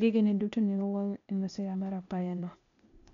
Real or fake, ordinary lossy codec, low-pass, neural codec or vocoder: fake; none; 7.2 kHz; codec, 16 kHz, 0.8 kbps, ZipCodec